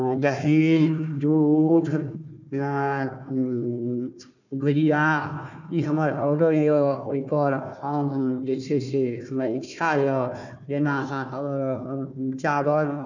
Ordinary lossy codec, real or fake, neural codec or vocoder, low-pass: none; fake; codec, 16 kHz, 1 kbps, FunCodec, trained on Chinese and English, 50 frames a second; 7.2 kHz